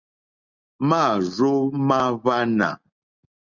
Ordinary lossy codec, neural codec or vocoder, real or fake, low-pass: Opus, 64 kbps; none; real; 7.2 kHz